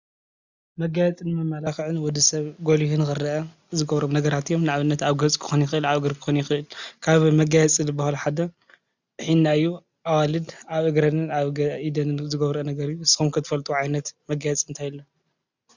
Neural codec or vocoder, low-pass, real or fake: none; 7.2 kHz; real